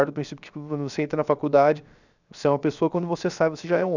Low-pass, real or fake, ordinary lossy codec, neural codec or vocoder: 7.2 kHz; fake; none; codec, 16 kHz, 0.7 kbps, FocalCodec